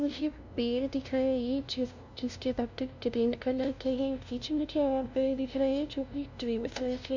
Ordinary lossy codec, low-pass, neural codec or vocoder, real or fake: none; 7.2 kHz; codec, 16 kHz, 0.5 kbps, FunCodec, trained on LibriTTS, 25 frames a second; fake